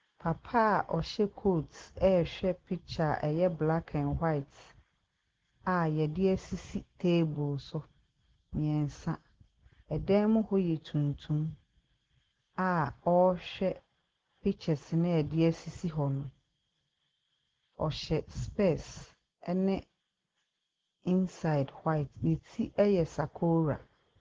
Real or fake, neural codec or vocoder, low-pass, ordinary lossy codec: real; none; 7.2 kHz; Opus, 16 kbps